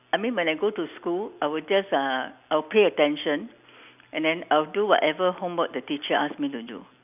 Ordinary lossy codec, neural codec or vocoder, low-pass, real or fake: none; none; 3.6 kHz; real